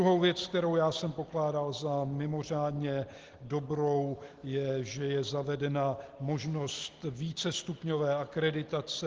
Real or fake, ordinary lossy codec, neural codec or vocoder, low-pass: real; Opus, 16 kbps; none; 7.2 kHz